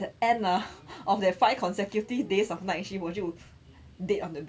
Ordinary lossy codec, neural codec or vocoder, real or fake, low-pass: none; none; real; none